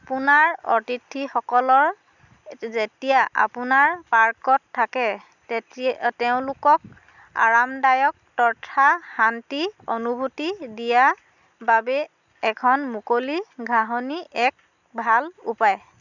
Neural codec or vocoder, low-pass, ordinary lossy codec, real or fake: none; 7.2 kHz; none; real